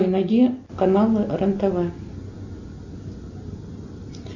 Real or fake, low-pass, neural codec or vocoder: real; 7.2 kHz; none